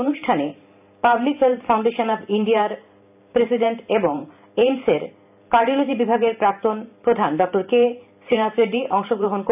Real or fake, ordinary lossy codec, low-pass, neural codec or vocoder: fake; none; 3.6 kHz; vocoder, 44.1 kHz, 128 mel bands every 512 samples, BigVGAN v2